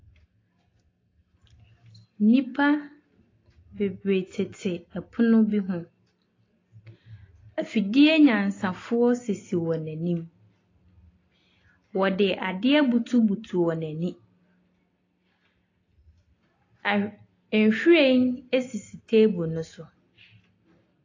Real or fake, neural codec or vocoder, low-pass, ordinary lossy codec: real; none; 7.2 kHz; AAC, 32 kbps